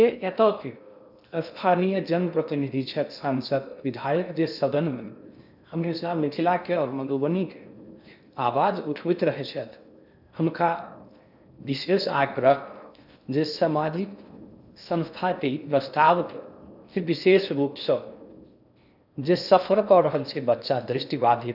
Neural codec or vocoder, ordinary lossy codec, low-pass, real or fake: codec, 16 kHz in and 24 kHz out, 0.6 kbps, FocalCodec, streaming, 2048 codes; none; 5.4 kHz; fake